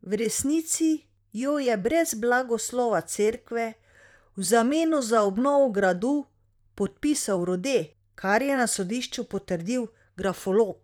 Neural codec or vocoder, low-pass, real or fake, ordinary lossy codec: vocoder, 44.1 kHz, 128 mel bands, Pupu-Vocoder; 19.8 kHz; fake; none